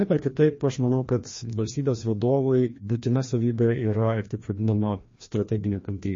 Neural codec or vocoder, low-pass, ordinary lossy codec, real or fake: codec, 16 kHz, 1 kbps, FreqCodec, larger model; 7.2 kHz; MP3, 32 kbps; fake